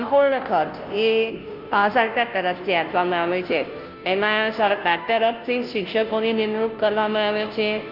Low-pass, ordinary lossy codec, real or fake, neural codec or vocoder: 5.4 kHz; Opus, 24 kbps; fake; codec, 16 kHz, 0.5 kbps, FunCodec, trained on Chinese and English, 25 frames a second